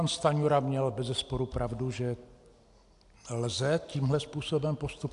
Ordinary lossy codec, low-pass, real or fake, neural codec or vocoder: AAC, 64 kbps; 10.8 kHz; real; none